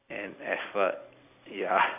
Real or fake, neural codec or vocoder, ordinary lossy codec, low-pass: real; none; none; 3.6 kHz